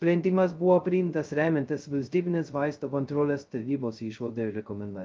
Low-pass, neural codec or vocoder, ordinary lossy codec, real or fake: 7.2 kHz; codec, 16 kHz, 0.2 kbps, FocalCodec; Opus, 24 kbps; fake